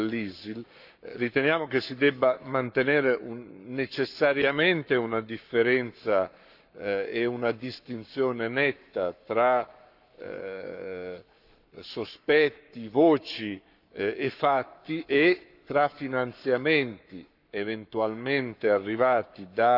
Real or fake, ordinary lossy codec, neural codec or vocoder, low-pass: fake; none; codec, 44.1 kHz, 7.8 kbps, Pupu-Codec; 5.4 kHz